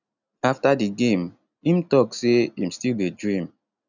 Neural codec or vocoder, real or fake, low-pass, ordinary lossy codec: none; real; 7.2 kHz; none